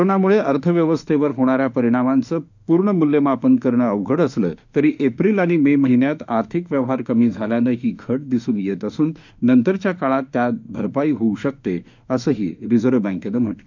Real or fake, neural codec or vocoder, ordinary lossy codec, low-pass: fake; autoencoder, 48 kHz, 32 numbers a frame, DAC-VAE, trained on Japanese speech; none; 7.2 kHz